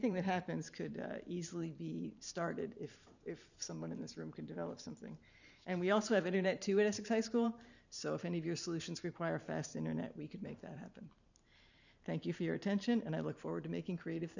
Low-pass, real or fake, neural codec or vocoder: 7.2 kHz; fake; vocoder, 44.1 kHz, 128 mel bands every 512 samples, BigVGAN v2